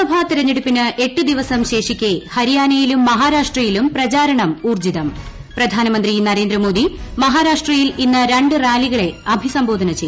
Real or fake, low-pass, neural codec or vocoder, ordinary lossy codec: real; none; none; none